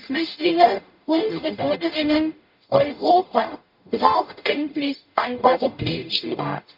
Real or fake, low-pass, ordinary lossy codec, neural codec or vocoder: fake; 5.4 kHz; none; codec, 44.1 kHz, 0.9 kbps, DAC